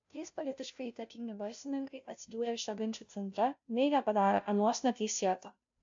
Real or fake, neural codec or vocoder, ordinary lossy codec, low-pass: fake; codec, 16 kHz, 0.5 kbps, FunCodec, trained on Chinese and English, 25 frames a second; AAC, 64 kbps; 7.2 kHz